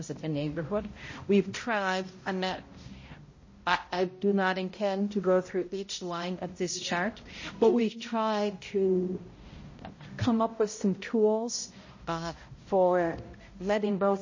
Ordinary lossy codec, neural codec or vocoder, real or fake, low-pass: MP3, 32 kbps; codec, 16 kHz, 0.5 kbps, X-Codec, HuBERT features, trained on balanced general audio; fake; 7.2 kHz